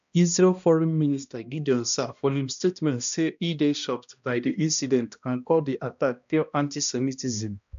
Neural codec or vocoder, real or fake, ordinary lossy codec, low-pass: codec, 16 kHz, 1 kbps, X-Codec, HuBERT features, trained on balanced general audio; fake; AAC, 96 kbps; 7.2 kHz